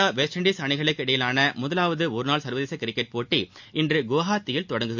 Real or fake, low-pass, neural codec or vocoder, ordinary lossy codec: real; 7.2 kHz; none; MP3, 64 kbps